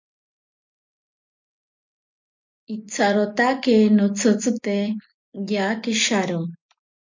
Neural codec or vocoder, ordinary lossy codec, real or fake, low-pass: none; MP3, 64 kbps; real; 7.2 kHz